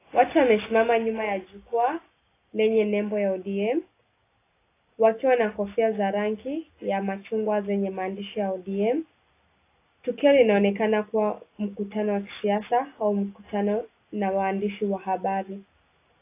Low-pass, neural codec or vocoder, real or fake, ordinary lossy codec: 3.6 kHz; none; real; AAC, 24 kbps